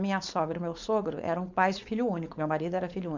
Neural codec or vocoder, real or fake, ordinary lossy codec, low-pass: codec, 16 kHz, 4.8 kbps, FACodec; fake; MP3, 64 kbps; 7.2 kHz